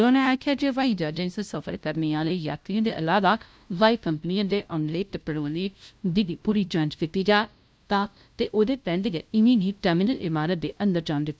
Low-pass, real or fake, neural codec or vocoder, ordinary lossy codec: none; fake; codec, 16 kHz, 0.5 kbps, FunCodec, trained on LibriTTS, 25 frames a second; none